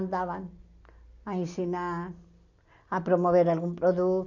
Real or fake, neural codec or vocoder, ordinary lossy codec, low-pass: real; none; none; 7.2 kHz